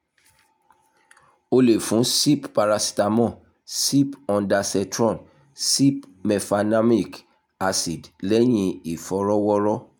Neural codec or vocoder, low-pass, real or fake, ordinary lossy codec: none; none; real; none